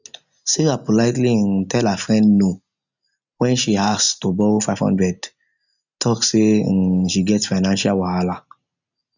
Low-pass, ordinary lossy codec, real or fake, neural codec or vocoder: 7.2 kHz; none; real; none